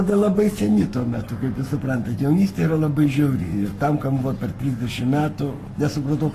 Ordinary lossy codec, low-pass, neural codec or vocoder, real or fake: AAC, 48 kbps; 14.4 kHz; codec, 44.1 kHz, 7.8 kbps, Pupu-Codec; fake